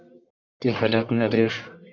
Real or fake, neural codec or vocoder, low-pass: fake; codec, 44.1 kHz, 1.7 kbps, Pupu-Codec; 7.2 kHz